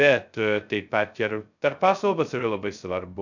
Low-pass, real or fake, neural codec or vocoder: 7.2 kHz; fake; codec, 16 kHz, 0.2 kbps, FocalCodec